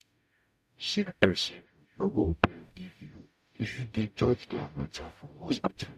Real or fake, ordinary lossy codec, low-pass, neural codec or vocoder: fake; none; 14.4 kHz; codec, 44.1 kHz, 0.9 kbps, DAC